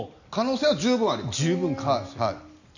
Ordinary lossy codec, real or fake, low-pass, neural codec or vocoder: none; real; 7.2 kHz; none